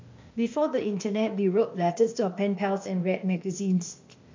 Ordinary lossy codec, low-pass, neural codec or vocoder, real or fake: MP3, 64 kbps; 7.2 kHz; codec, 16 kHz, 0.8 kbps, ZipCodec; fake